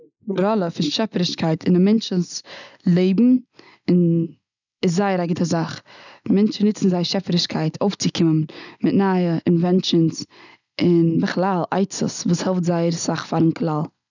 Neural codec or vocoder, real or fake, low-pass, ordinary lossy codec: none; real; 7.2 kHz; none